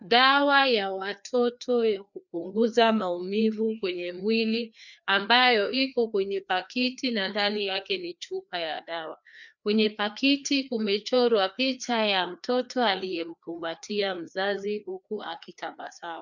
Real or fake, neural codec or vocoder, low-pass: fake; codec, 16 kHz, 2 kbps, FreqCodec, larger model; 7.2 kHz